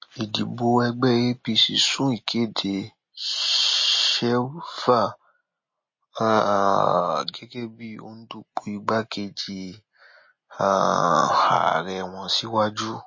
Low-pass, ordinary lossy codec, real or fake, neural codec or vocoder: 7.2 kHz; MP3, 32 kbps; real; none